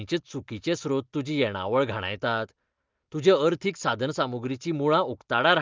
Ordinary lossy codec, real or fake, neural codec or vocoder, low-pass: Opus, 32 kbps; real; none; 7.2 kHz